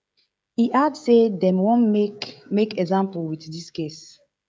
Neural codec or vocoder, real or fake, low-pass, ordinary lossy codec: codec, 16 kHz, 16 kbps, FreqCodec, smaller model; fake; none; none